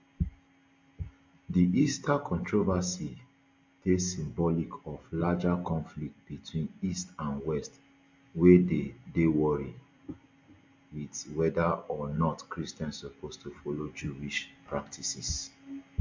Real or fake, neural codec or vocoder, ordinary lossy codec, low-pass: real; none; MP3, 48 kbps; 7.2 kHz